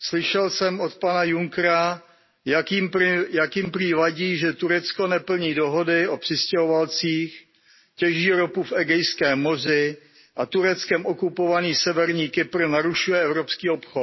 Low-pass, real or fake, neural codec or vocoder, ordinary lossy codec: 7.2 kHz; real; none; MP3, 24 kbps